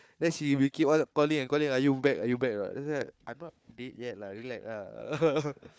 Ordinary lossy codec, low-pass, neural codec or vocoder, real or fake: none; none; codec, 16 kHz, 4 kbps, FunCodec, trained on Chinese and English, 50 frames a second; fake